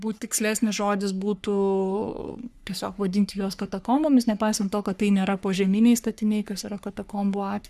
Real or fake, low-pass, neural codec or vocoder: fake; 14.4 kHz; codec, 44.1 kHz, 3.4 kbps, Pupu-Codec